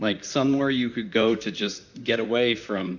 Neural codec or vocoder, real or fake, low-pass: vocoder, 44.1 kHz, 128 mel bands, Pupu-Vocoder; fake; 7.2 kHz